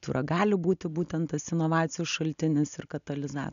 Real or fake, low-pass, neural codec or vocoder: real; 7.2 kHz; none